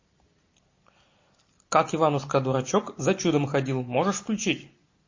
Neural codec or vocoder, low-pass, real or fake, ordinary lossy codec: none; 7.2 kHz; real; MP3, 32 kbps